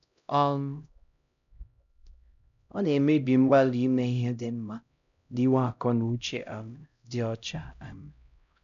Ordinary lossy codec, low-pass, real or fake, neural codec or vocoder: none; 7.2 kHz; fake; codec, 16 kHz, 0.5 kbps, X-Codec, HuBERT features, trained on LibriSpeech